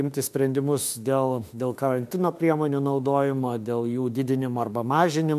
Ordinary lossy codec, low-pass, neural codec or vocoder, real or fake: MP3, 96 kbps; 14.4 kHz; autoencoder, 48 kHz, 32 numbers a frame, DAC-VAE, trained on Japanese speech; fake